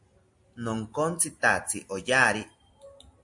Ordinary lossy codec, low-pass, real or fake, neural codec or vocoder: MP3, 48 kbps; 10.8 kHz; real; none